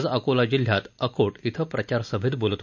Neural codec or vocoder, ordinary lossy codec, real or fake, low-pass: none; none; real; 7.2 kHz